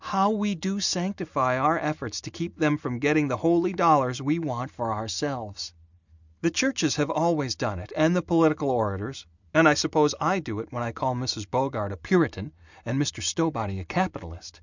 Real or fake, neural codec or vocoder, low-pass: real; none; 7.2 kHz